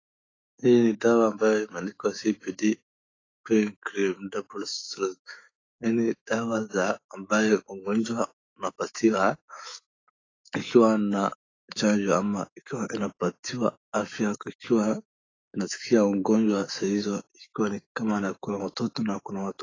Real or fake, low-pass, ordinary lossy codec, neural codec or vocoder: fake; 7.2 kHz; AAC, 32 kbps; autoencoder, 48 kHz, 128 numbers a frame, DAC-VAE, trained on Japanese speech